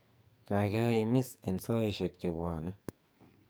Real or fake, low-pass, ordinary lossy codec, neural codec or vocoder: fake; none; none; codec, 44.1 kHz, 2.6 kbps, SNAC